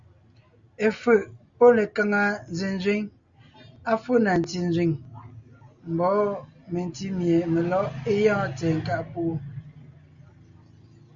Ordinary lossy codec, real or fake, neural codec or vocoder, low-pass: Opus, 64 kbps; real; none; 7.2 kHz